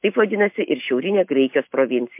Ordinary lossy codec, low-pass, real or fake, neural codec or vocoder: MP3, 32 kbps; 3.6 kHz; real; none